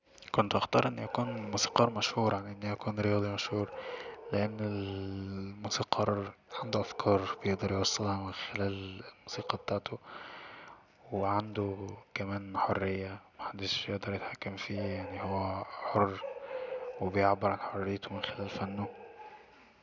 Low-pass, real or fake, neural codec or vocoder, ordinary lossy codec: 7.2 kHz; real; none; none